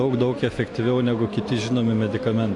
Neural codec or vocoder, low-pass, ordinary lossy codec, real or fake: none; 10.8 kHz; AAC, 48 kbps; real